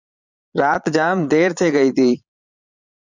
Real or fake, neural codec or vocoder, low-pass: fake; vocoder, 22.05 kHz, 80 mel bands, Vocos; 7.2 kHz